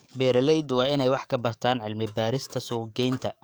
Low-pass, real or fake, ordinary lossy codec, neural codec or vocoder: none; fake; none; codec, 44.1 kHz, 7.8 kbps, DAC